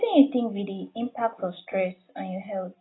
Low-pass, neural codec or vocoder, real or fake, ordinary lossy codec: 7.2 kHz; none; real; AAC, 16 kbps